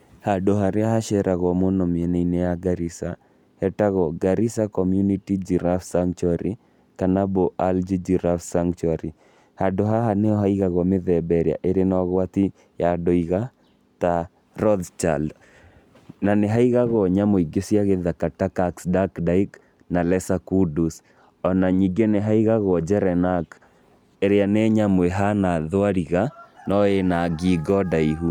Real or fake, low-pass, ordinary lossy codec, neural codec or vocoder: real; 19.8 kHz; none; none